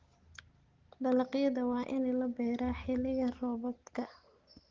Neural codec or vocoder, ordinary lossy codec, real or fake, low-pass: none; Opus, 16 kbps; real; 7.2 kHz